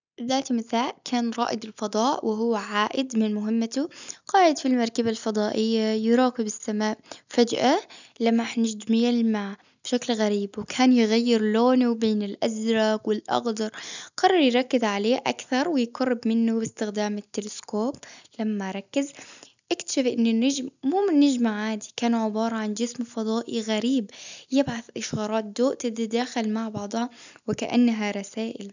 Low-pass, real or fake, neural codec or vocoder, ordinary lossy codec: 7.2 kHz; real; none; none